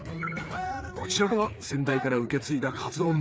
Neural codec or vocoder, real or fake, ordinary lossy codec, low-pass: codec, 16 kHz, 4 kbps, FreqCodec, larger model; fake; none; none